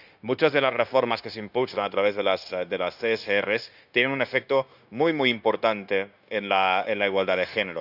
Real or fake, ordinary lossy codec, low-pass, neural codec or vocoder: fake; none; 5.4 kHz; codec, 16 kHz, 0.9 kbps, LongCat-Audio-Codec